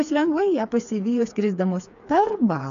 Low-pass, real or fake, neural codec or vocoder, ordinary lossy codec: 7.2 kHz; fake; codec, 16 kHz, 4 kbps, FreqCodec, smaller model; MP3, 96 kbps